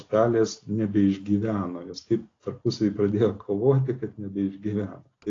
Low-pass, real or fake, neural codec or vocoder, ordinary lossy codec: 7.2 kHz; real; none; AAC, 32 kbps